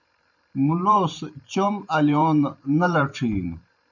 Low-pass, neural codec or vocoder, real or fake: 7.2 kHz; vocoder, 44.1 kHz, 128 mel bands every 512 samples, BigVGAN v2; fake